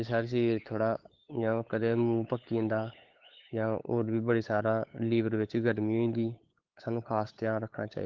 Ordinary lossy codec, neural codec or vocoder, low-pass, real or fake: Opus, 16 kbps; codec, 16 kHz, 8 kbps, FunCodec, trained on LibriTTS, 25 frames a second; 7.2 kHz; fake